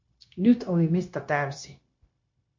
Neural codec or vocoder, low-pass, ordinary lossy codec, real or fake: codec, 16 kHz, 0.9 kbps, LongCat-Audio-Codec; 7.2 kHz; MP3, 48 kbps; fake